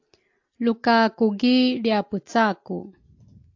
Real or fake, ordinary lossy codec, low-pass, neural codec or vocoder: real; AAC, 48 kbps; 7.2 kHz; none